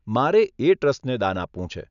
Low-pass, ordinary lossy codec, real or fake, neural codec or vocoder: 7.2 kHz; none; real; none